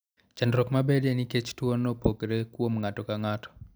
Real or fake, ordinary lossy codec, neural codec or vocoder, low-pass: real; none; none; none